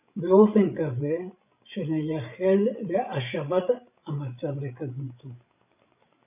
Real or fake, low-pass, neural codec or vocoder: fake; 3.6 kHz; codec, 16 kHz, 16 kbps, FreqCodec, larger model